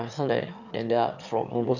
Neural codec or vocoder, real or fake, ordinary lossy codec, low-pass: autoencoder, 22.05 kHz, a latent of 192 numbers a frame, VITS, trained on one speaker; fake; none; 7.2 kHz